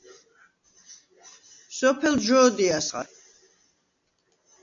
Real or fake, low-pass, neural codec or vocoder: real; 7.2 kHz; none